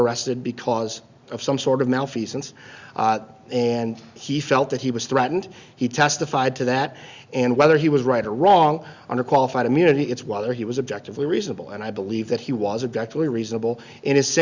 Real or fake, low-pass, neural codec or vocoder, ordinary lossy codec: real; 7.2 kHz; none; Opus, 64 kbps